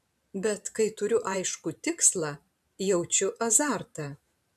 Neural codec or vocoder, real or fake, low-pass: vocoder, 44.1 kHz, 128 mel bands every 512 samples, BigVGAN v2; fake; 14.4 kHz